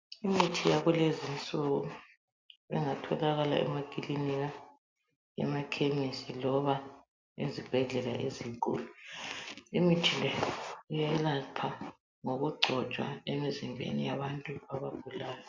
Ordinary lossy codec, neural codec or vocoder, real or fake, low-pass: MP3, 64 kbps; none; real; 7.2 kHz